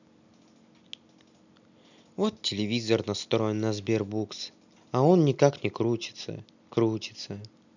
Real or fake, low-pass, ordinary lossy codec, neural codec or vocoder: real; 7.2 kHz; none; none